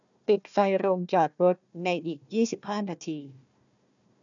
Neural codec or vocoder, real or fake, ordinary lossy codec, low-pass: codec, 16 kHz, 1 kbps, FunCodec, trained on Chinese and English, 50 frames a second; fake; none; 7.2 kHz